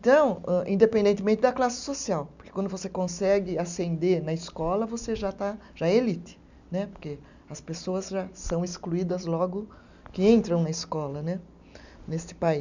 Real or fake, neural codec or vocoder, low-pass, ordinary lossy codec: real; none; 7.2 kHz; none